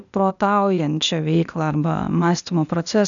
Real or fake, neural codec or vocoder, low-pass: fake; codec, 16 kHz, 0.8 kbps, ZipCodec; 7.2 kHz